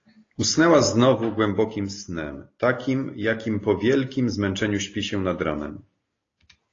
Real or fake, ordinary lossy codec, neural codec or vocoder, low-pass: real; AAC, 32 kbps; none; 7.2 kHz